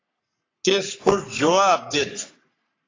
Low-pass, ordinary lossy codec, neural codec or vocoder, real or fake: 7.2 kHz; AAC, 32 kbps; codec, 44.1 kHz, 3.4 kbps, Pupu-Codec; fake